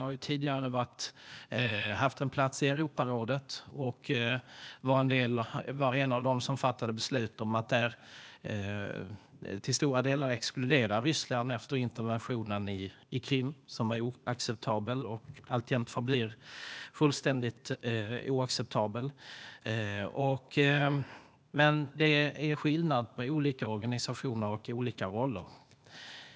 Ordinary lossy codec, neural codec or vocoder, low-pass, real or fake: none; codec, 16 kHz, 0.8 kbps, ZipCodec; none; fake